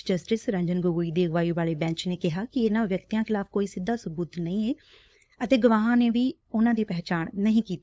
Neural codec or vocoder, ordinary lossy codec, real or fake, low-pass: codec, 16 kHz, 8 kbps, FunCodec, trained on LibriTTS, 25 frames a second; none; fake; none